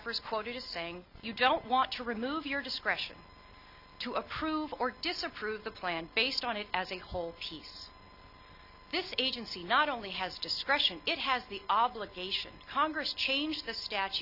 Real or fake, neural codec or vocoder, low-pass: real; none; 5.4 kHz